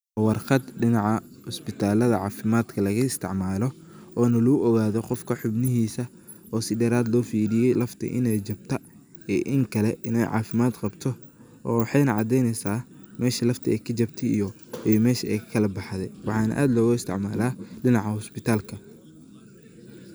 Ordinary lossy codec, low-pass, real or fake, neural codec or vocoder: none; none; real; none